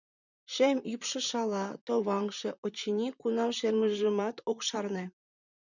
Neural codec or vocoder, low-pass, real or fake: vocoder, 44.1 kHz, 128 mel bands, Pupu-Vocoder; 7.2 kHz; fake